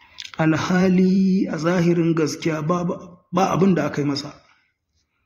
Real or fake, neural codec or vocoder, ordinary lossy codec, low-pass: fake; vocoder, 44.1 kHz, 128 mel bands every 512 samples, BigVGAN v2; AAC, 48 kbps; 19.8 kHz